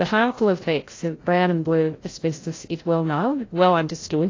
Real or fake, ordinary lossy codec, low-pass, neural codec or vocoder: fake; AAC, 32 kbps; 7.2 kHz; codec, 16 kHz, 0.5 kbps, FreqCodec, larger model